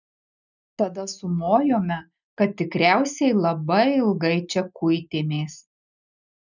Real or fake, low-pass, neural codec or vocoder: real; 7.2 kHz; none